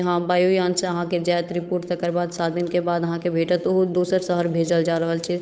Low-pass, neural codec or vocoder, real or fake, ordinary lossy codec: none; codec, 16 kHz, 8 kbps, FunCodec, trained on Chinese and English, 25 frames a second; fake; none